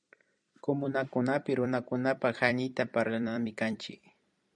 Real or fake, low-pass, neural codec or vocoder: fake; 9.9 kHz; vocoder, 44.1 kHz, 128 mel bands every 256 samples, BigVGAN v2